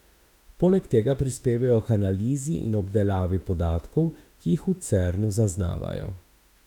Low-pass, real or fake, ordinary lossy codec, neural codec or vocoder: 19.8 kHz; fake; none; autoencoder, 48 kHz, 32 numbers a frame, DAC-VAE, trained on Japanese speech